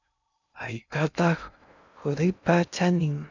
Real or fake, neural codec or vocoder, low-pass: fake; codec, 16 kHz in and 24 kHz out, 0.6 kbps, FocalCodec, streaming, 2048 codes; 7.2 kHz